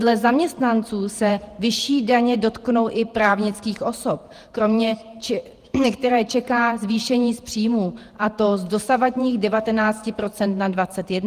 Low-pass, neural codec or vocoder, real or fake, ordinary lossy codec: 14.4 kHz; vocoder, 48 kHz, 128 mel bands, Vocos; fake; Opus, 24 kbps